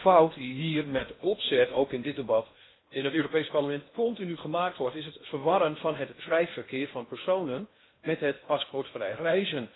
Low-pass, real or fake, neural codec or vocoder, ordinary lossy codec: 7.2 kHz; fake; codec, 16 kHz in and 24 kHz out, 0.8 kbps, FocalCodec, streaming, 65536 codes; AAC, 16 kbps